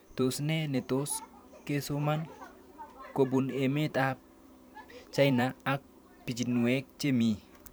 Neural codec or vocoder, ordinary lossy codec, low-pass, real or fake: vocoder, 44.1 kHz, 128 mel bands every 512 samples, BigVGAN v2; none; none; fake